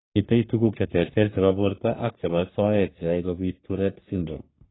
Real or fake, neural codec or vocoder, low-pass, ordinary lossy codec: fake; codec, 44.1 kHz, 3.4 kbps, Pupu-Codec; 7.2 kHz; AAC, 16 kbps